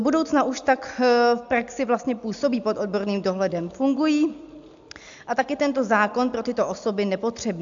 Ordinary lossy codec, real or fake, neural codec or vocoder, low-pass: AAC, 64 kbps; real; none; 7.2 kHz